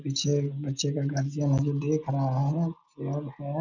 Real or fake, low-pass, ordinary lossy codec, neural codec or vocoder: real; 7.2 kHz; none; none